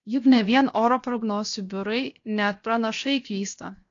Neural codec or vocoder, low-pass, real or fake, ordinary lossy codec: codec, 16 kHz, 0.7 kbps, FocalCodec; 7.2 kHz; fake; AAC, 48 kbps